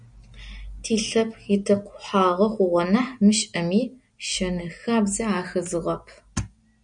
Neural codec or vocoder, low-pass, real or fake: none; 9.9 kHz; real